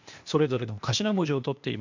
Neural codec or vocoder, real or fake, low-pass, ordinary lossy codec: codec, 16 kHz, 0.8 kbps, ZipCodec; fake; 7.2 kHz; MP3, 64 kbps